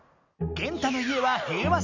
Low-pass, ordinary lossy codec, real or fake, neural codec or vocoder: 7.2 kHz; none; real; none